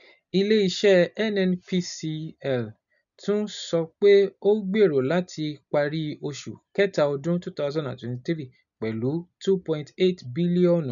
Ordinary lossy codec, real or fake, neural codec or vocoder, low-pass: none; real; none; 7.2 kHz